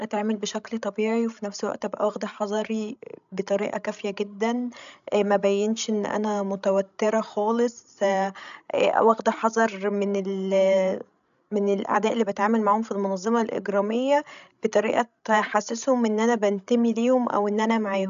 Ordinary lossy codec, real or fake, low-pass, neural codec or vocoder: AAC, 96 kbps; fake; 7.2 kHz; codec, 16 kHz, 16 kbps, FreqCodec, larger model